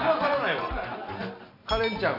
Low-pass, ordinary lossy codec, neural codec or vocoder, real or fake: 5.4 kHz; none; none; real